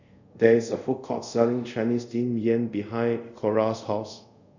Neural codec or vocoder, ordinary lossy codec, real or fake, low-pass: codec, 24 kHz, 0.5 kbps, DualCodec; none; fake; 7.2 kHz